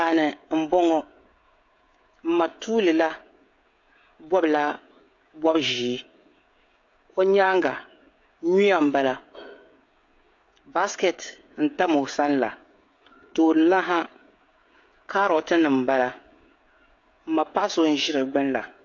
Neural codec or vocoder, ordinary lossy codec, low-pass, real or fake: codec, 16 kHz, 16 kbps, FreqCodec, smaller model; AAC, 48 kbps; 7.2 kHz; fake